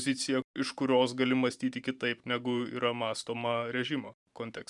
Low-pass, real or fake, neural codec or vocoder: 10.8 kHz; fake; vocoder, 44.1 kHz, 128 mel bands every 512 samples, BigVGAN v2